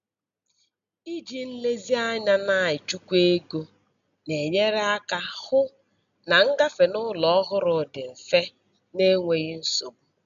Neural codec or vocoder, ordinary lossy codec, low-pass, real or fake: none; none; 7.2 kHz; real